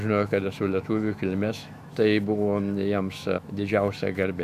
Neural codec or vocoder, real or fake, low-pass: autoencoder, 48 kHz, 128 numbers a frame, DAC-VAE, trained on Japanese speech; fake; 14.4 kHz